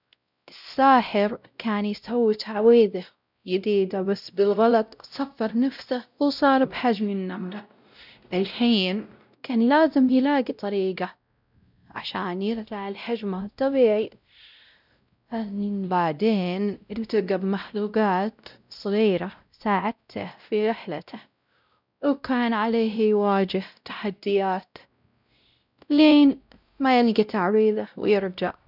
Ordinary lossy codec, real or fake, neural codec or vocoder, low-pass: none; fake; codec, 16 kHz, 0.5 kbps, X-Codec, WavLM features, trained on Multilingual LibriSpeech; 5.4 kHz